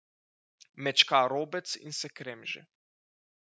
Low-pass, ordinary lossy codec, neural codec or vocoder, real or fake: none; none; none; real